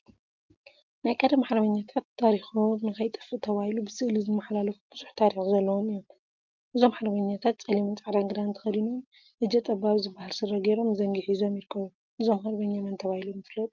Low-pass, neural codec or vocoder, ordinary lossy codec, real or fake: 7.2 kHz; none; Opus, 24 kbps; real